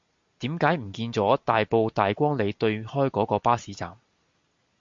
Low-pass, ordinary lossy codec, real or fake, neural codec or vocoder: 7.2 kHz; MP3, 48 kbps; real; none